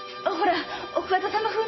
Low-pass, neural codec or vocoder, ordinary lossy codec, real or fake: 7.2 kHz; none; MP3, 24 kbps; real